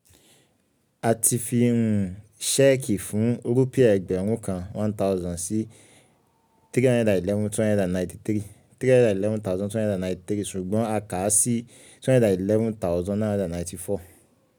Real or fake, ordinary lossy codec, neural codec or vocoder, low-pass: real; none; none; none